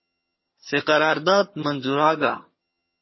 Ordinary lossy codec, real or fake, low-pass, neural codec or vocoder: MP3, 24 kbps; fake; 7.2 kHz; vocoder, 22.05 kHz, 80 mel bands, HiFi-GAN